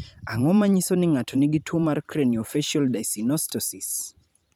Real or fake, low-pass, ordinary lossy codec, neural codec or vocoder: fake; none; none; vocoder, 44.1 kHz, 128 mel bands every 512 samples, BigVGAN v2